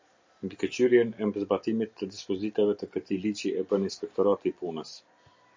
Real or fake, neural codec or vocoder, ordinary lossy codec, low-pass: real; none; MP3, 64 kbps; 7.2 kHz